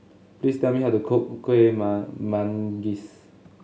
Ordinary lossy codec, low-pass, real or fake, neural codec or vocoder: none; none; real; none